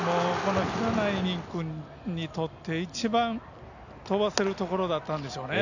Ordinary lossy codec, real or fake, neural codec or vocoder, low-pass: none; real; none; 7.2 kHz